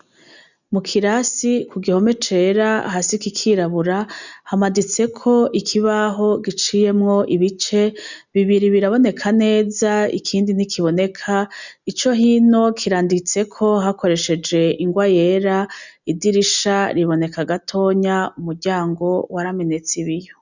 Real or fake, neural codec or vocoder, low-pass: real; none; 7.2 kHz